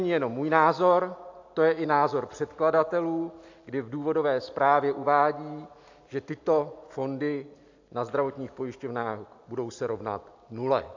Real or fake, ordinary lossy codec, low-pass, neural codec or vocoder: real; AAC, 48 kbps; 7.2 kHz; none